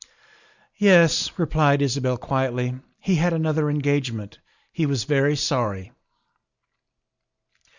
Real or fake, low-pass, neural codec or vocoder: real; 7.2 kHz; none